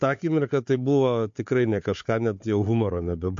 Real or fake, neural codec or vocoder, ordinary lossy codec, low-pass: fake; codec, 16 kHz, 4 kbps, FunCodec, trained on Chinese and English, 50 frames a second; MP3, 48 kbps; 7.2 kHz